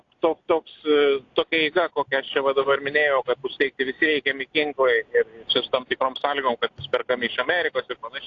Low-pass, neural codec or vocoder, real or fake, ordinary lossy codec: 7.2 kHz; none; real; AAC, 48 kbps